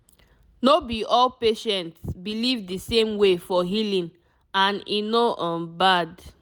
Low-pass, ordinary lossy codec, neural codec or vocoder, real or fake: none; none; none; real